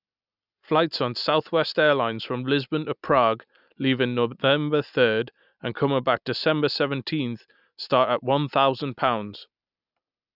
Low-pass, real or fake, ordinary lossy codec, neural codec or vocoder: 5.4 kHz; real; none; none